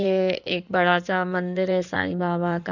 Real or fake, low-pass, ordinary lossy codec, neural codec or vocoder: fake; 7.2 kHz; none; codec, 16 kHz in and 24 kHz out, 2.2 kbps, FireRedTTS-2 codec